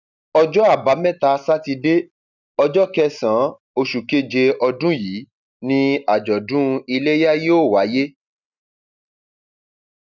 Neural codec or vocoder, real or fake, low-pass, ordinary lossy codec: none; real; 7.2 kHz; none